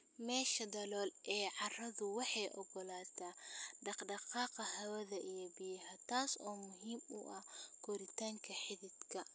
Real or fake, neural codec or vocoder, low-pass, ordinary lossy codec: real; none; none; none